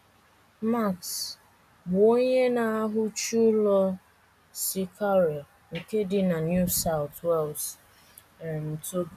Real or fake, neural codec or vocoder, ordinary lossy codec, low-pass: real; none; none; 14.4 kHz